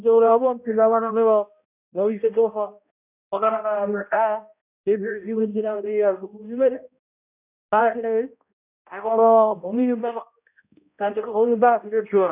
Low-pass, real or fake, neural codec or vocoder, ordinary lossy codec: 3.6 kHz; fake; codec, 16 kHz, 0.5 kbps, X-Codec, HuBERT features, trained on balanced general audio; none